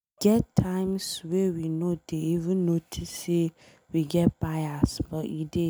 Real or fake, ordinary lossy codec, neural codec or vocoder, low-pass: real; none; none; none